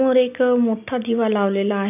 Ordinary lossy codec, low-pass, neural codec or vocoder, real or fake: none; 3.6 kHz; none; real